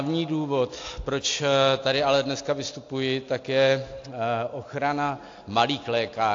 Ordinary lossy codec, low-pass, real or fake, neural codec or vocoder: AAC, 48 kbps; 7.2 kHz; real; none